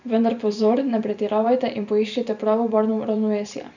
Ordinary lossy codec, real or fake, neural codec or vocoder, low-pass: none; fake; vocoder, 44.1 kHz, 128 mel bands every 512 samples, BigVGAN v2; 7.2 kHz